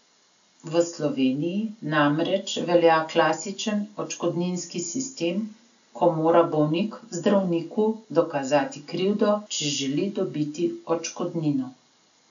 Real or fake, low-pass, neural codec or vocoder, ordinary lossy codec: real; 7.2 kHz; none; none